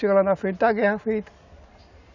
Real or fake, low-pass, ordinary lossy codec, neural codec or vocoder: real; 7.2 kHz; none; none